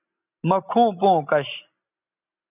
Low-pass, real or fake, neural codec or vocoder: 3.6 kHz; real; none